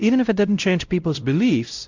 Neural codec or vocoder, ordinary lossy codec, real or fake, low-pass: codec, 16 kHz, 0.5 kbps, X-Codec, WavLM features, trained on Multilingual LibriSpeech; Opus, 64 kbps; fake; 7.2 kHz